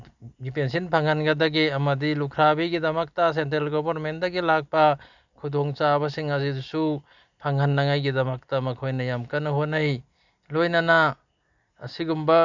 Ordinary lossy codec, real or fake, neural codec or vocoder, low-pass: none; real; none; 7.2 kHz